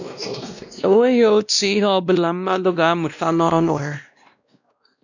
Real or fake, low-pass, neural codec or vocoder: fake; 7.2 kHz; codec, 16 kHz, 1 kbps, X-Codec, WavLM features, trained on Multilingual LibriSpeech